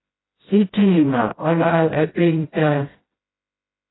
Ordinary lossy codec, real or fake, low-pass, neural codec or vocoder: AAC, 16 kbps; fake; 7.2 kHz; codec, 16 kHz, 0.5 kbps, FreqCodec, smaller model